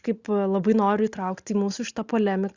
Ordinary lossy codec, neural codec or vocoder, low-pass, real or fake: Opus, 64 kbps; none; 7.2 kHz; real